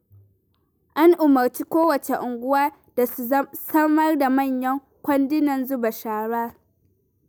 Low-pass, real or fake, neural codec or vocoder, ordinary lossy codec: none; real; none; none